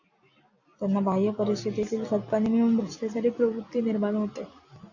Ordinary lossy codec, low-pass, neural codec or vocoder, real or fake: AAC, 48 kbps; 7.2 kHz; none; real